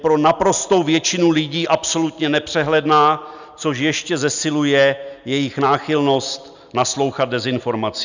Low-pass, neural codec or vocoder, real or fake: 7.2 kHz; none; real